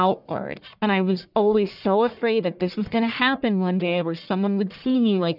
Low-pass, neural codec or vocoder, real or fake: 5.4 kHz; codec, 44.1 kHz, 1.7 kbps, Pupu-Codec; fake